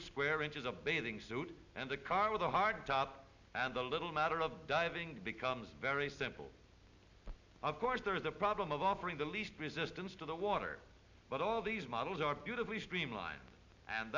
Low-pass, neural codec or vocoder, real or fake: 7.2 kHz; none; real